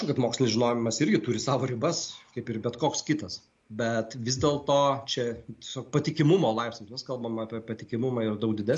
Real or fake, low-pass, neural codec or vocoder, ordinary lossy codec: real; 10.8 kHz; none; MP3, 48 kbps